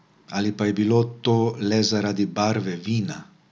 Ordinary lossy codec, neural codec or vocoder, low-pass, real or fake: none; none; none; real